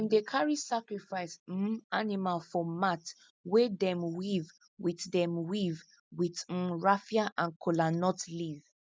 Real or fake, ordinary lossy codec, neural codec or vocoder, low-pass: real; none; none; none